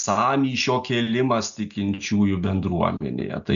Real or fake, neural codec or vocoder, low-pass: real; none; 7.2 kHz